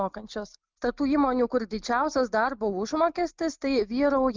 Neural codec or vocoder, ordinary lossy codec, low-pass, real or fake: none; Opus, 24 kbps; 7.2 kHz; real